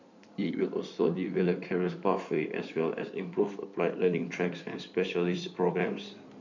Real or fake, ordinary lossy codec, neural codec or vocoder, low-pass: fake; none; codec, 16 kHz in and 24 kHz out, 2.2 kbps, FireRedTTS-2 codec; 7.2 kHz